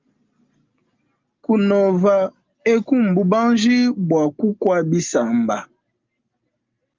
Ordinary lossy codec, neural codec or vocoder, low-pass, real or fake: Opus, 24 kbps; none; 7.2 kHz; real